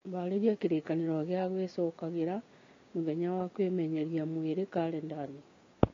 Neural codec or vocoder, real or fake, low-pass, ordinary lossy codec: codec, 16 kHz, 6 kbps, DAC; fake; 7.2 kHz; AAC, 32 kbps